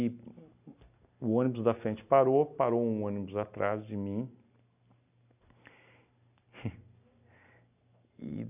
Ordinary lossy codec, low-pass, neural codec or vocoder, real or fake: none; 3.6 kHz; none; real